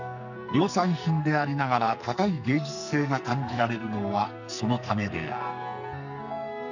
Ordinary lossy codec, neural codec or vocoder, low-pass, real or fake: none; codec, 44.1 kHz, 2.6 kbps, SNAC; 7.2 kHz; fake